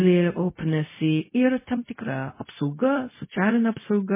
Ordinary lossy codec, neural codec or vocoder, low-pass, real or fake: MP3, 16 kbps; codec, 16 kHz, 0.4 kbps, LongCat-Audio-Codec; 3.6 kHz; fake